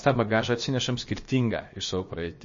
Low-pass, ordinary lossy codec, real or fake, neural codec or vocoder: 7.2 kHz; MP3, 32 kbps; fake; codec, 16 kHz, about 1 kbps, DyCAST, with the encoder's durations